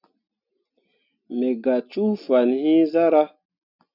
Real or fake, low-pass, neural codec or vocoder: real; 5.4 kHz; none